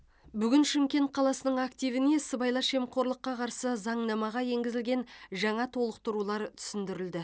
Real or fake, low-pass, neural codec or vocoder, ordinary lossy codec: real; none; none; none